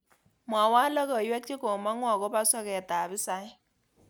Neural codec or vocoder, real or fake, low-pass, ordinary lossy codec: none; real; none; none